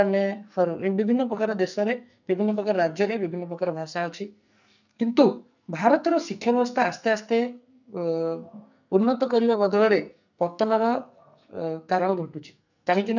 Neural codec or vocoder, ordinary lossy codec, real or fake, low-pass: codec, 44.1 kHz, 2.6 kbps, SNAC; none; fake; 7.2 kHz